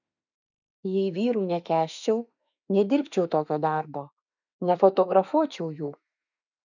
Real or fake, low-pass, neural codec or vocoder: fake; 7.2 kHz; autoencoder, 48 kHz, 32 numbers a frame, DAC-VAE, trained on Japanese speech